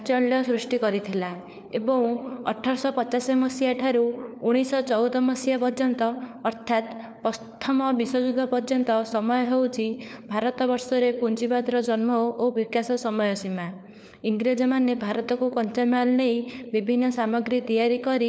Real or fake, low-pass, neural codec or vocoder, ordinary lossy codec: fake; none; codec, 16 kHz, 4 kbps, FunCodec, trained on LibriTTS, 50 frames a second; none